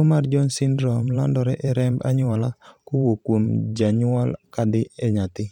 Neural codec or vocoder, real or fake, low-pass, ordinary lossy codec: vocoder, 44.1 kHz, 128 mel bands every 512 samples, BigVGAN v2; fake; 19.8 kHz; none